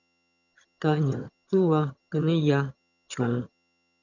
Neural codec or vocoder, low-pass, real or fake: vocoder, 22.05 kHz, 80 mel bands, HiFi-GAN; 7.2 kHz; fake